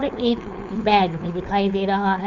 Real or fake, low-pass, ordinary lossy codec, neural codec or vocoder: fake; 7.2 kHz; none; codec, 16 kHz, 4.8 kbps, FACodec